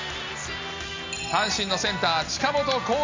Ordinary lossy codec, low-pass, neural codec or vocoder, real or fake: MP3, 64 kbps; 7.2 kHz; none; real